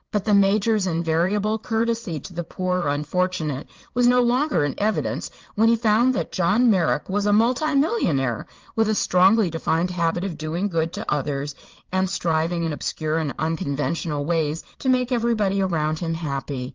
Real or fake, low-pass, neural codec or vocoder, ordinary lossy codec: fake; 7.2 kHz; vocoder, 22.05 kHz, 80 mel bands, Vocos; Opus, 32 kbps